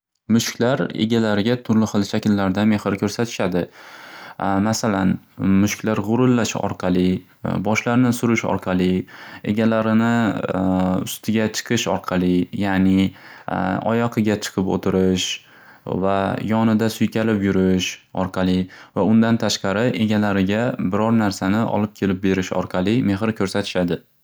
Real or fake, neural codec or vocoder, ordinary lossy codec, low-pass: real; none; none; none